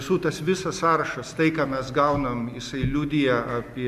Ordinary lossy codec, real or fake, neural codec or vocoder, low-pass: MP3, 96 kbps; real; none; 14.4 kHz